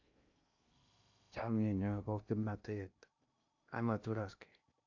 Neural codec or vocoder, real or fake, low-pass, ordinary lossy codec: codec, 16 kHz in and 24 kHz out, 0.6 kbps, FocalCodec, streaming, 2048 codes; fake; 7.2 kHz; AAC, 48 kbps